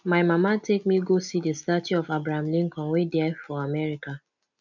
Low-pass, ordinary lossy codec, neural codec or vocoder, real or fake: 7.2 kHz; none; none; real